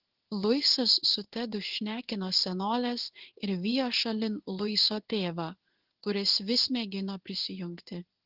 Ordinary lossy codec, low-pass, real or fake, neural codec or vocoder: Opus, 16 kbps; 5.4 kHz; fake; codec, 16 kHz in and 24 kHz out, 1 kbps, XY-Tokenizer